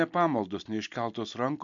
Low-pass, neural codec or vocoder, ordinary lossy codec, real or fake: 7.2 kHz; none; MP3, 64 kbps; real